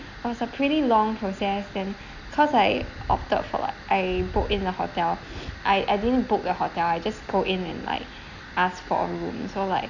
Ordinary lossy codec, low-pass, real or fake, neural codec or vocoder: none; 7.2 kHz; real; none